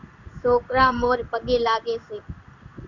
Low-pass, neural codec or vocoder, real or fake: 7.2 kHz; codec, 16 kHz in and 24 kHz out, 1 kbps, XY-Tokenizer; fake